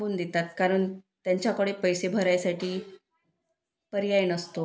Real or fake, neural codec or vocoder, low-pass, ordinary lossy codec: real; none; none; none